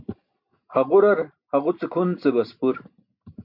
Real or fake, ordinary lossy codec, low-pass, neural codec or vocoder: real; MP3, 48 kbps; 5.4 kHz; none